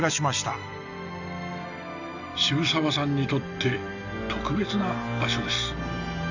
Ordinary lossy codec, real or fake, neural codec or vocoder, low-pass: none; real; none; 7.2 kHz